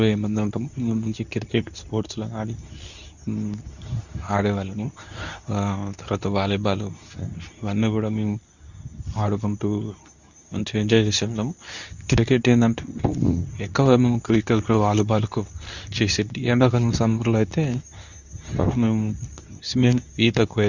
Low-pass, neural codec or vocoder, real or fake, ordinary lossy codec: 7.2 kHz; codec, 24 kHz, 0.9 kbps, WavTokenizer, medium speech release version 2; fake; none